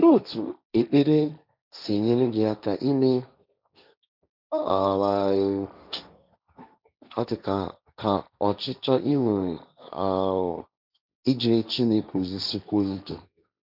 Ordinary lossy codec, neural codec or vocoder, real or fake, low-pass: none; codec, 16 kHz, 1.1 kbps, Voila-Tokenizer; fake; 5.4 kHz